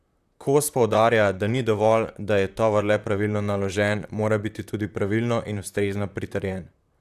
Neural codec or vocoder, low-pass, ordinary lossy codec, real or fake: vocoder, 44.1 kHz, 128 mel bands, Pupu-Vocoder; 14.4 kHz; AAC, 96 kbps; fake